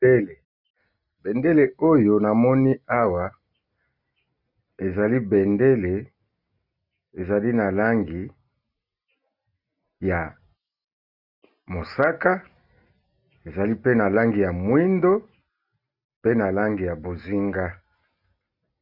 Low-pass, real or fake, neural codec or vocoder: 5.4 kHz; real; none